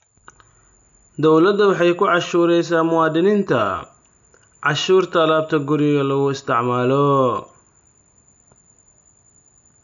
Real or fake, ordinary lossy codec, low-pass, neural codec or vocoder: real; none; 7.2 kHz; none